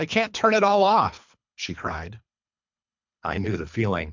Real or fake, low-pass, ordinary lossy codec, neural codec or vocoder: fake; 7.2 kHz; MP3, 64 kbps; codec, 24 kHz, 3 kbps, HILCodec